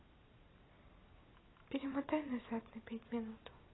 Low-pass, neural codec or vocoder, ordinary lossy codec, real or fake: 7.2 kHz; vocoder, 44.1 kHz, 128 mel bands every 256 samples, BigVGAN v2; AAC, 16 kbps; fake